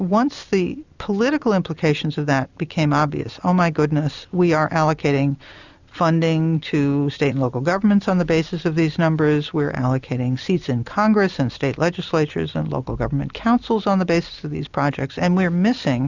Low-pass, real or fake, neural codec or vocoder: 7.2 kHz; real; none